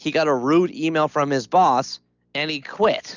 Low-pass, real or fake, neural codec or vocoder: 7.2 kHz; real; none